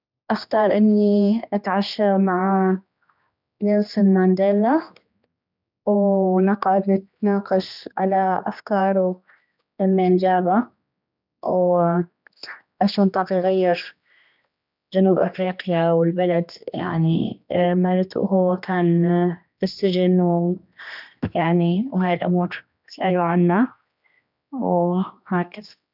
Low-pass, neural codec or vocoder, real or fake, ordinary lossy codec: 5.4 kHz; codec, 16 kHz, 2 kbps, X-Codec, HuBERT features, trained on general audio; fake; none